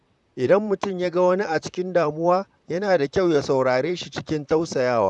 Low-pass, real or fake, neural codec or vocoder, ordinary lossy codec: 10.8 kHz; fake; vocoder, 44.1 kHz, 128 mel bands, Pupu-Vocoder; none